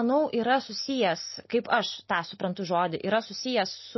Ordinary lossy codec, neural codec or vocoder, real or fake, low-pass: MP3, 24 kbps; none; real; 7.2 kHz